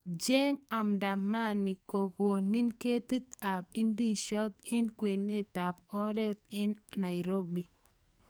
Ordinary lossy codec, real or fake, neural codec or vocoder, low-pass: none; fake; codec, 44.1 kHz, 2.6 kbps, SNAC; none